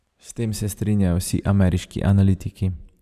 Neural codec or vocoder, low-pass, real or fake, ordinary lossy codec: vocoder, 44.1 kHz, 128 mel bands every 512 samples, BigVGAN v2; 14.4 kHz; fake; none